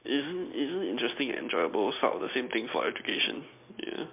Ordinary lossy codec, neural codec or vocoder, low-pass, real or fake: MP3, 32 kbps; none; 3.6 kHz; real